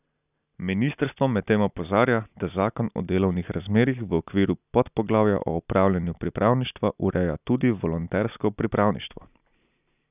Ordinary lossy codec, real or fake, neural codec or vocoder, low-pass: none; real; none; 3.6 kHz